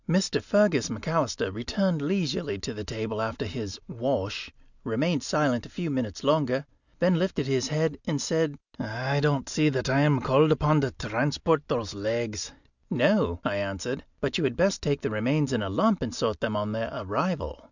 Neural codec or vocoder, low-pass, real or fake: none; 7.2 kHz; real